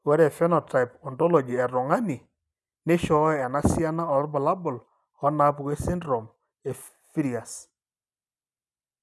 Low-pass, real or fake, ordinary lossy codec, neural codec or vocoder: none; real; none; none